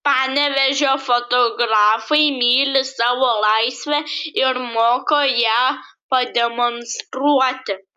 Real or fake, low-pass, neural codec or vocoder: real; 14.4 kHz; none